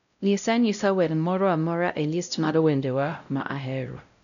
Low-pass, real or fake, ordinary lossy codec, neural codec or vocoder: 7.2 kHz; fake; none; codec, 16 kHz, 0.5 kbps, X-Codec, WavLM features, trained on Multilingual LibriSpeech